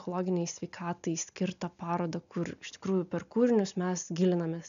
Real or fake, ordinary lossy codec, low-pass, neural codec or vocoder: real; MP3, 96 kbps; 7.2 kHz; none